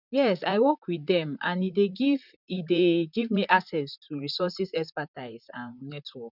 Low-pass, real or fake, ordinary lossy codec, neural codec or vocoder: 5.4 kHz; fake; none; vocoder, 44.1 kHz, 128 mel bands, Pupu-Vocoder